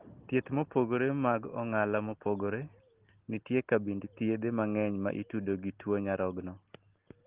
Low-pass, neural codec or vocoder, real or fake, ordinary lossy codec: 3.6 kHz; none; real; Opus, 16 kbps